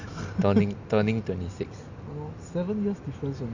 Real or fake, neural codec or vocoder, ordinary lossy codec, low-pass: real; none; Opus, 64 kbps; 7.2 kHz